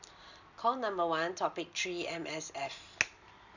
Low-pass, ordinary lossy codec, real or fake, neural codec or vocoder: 7.2 kHz; none; real; none